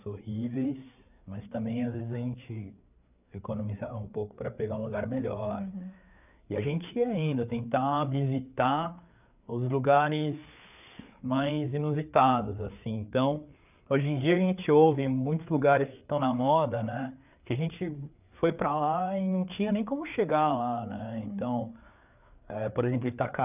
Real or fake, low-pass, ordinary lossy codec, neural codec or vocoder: fake; 3.6 kHz; none; codec, 16 kHz, 4 kbps, FreqCodec, larger model